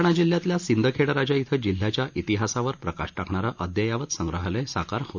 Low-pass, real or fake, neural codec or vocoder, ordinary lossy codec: 7.2 kHz; real; none; none